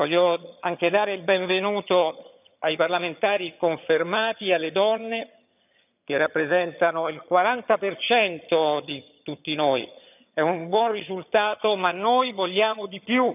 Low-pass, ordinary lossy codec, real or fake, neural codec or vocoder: 3.6 kHz; none; fake; vocoder, 22.05 kHz, 80 mel bands, HiFi-GAN